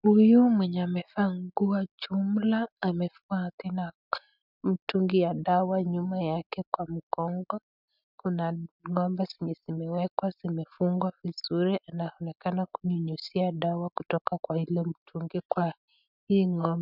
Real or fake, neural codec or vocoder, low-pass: real; none; 5.4 kHz